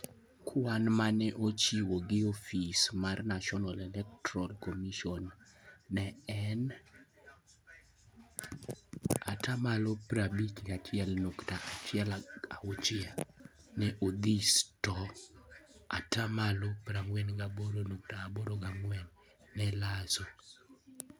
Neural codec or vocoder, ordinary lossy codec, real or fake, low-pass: none; none; real; none